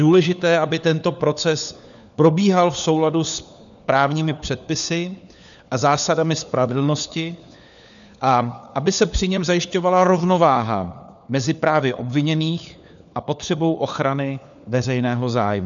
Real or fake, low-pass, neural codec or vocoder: fake; 7.2 kHz; codec, 16 kHz, 4 kbps, FunCodec, trained on LibriTTS, 50 frames a second